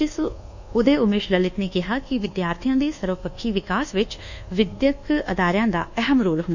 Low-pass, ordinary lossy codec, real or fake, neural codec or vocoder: 7.2 kHz; none; fake; codec, 24 kHz, 1.2 kbps, DualCodec